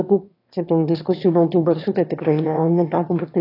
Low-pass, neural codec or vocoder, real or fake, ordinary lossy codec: 5.4 kHz; autoencoder, 22.05 kHz, a latent of 192 numbers a frame, VITS, trained on one speaker; fake; AAC, 32 kbps